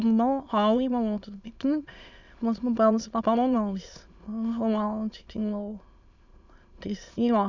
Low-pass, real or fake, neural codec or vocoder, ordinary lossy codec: 7.2 kHz; fake; autoencoder, 22.05 kHz, a latent of 192 numbers a frame, VITS, trained on many speakers; none